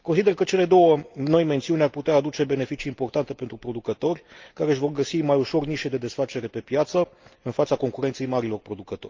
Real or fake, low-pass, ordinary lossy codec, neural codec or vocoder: real; 7.2 kHz; Opus, 24 kbps; none